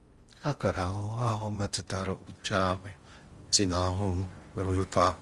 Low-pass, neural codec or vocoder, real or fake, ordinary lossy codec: 10.8 kHz; codec, 16 kHz in and 24 kHz out, 0.6 kbps, FocalCodec, streaming, 4096 codes; fake; Opus, 32 kbps